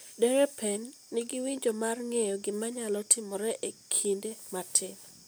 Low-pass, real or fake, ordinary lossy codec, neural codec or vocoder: none; real; none; none